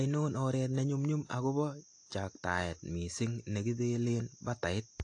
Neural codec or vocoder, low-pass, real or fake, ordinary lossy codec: none; 9.9 kHz; real; AAC, 48 kbps